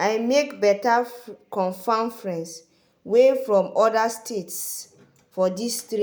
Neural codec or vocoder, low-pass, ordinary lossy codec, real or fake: none; none; none; real